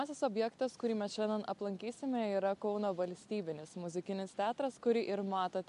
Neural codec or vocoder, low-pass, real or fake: none; 10.8 kHz; real